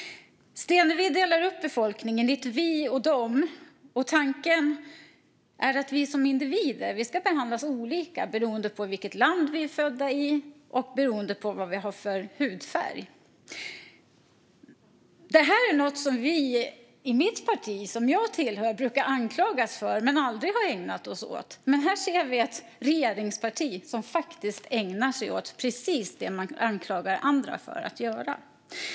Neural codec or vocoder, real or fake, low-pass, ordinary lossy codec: none; real; none; none